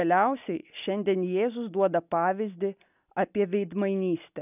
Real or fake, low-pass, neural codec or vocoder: real; 3.6 kHz; none